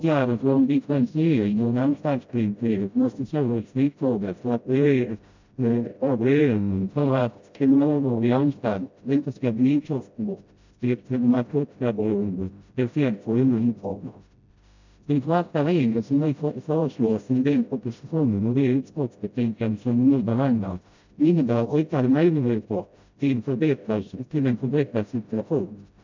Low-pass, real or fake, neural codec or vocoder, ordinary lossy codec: 7.2 kHz; fake; codec, 16 kHz, 0.5 kbps, FreqCodec, smaller model; MP3, 64 kbps